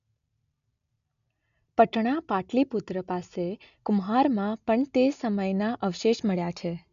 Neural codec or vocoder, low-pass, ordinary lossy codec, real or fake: none; 7.2 kHz; none; real